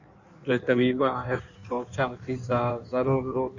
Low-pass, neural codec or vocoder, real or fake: 7.2 kHz; codec, 16 kHz in and 24 kHz out, 1.1 kbps, FireRedTTS-2 codec; fake